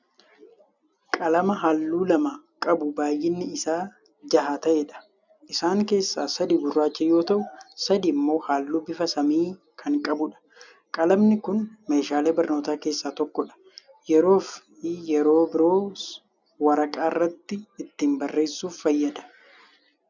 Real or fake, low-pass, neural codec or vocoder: real; 7.2 kHz; none